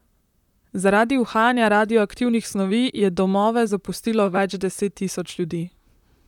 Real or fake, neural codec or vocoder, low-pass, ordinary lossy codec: fake; vocoder, 44.1 kHz, 128 mel bands, Pupu-Vocoder; 19.8 kHz; none